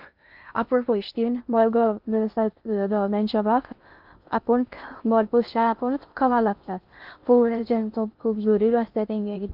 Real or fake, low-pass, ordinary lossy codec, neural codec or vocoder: fake; 5.4 kHz; Opus, 24 kbps; codec, 16 kHz in and 24 kHz out, 0.6 kbps, FocalCodec, streaming, 2048 codes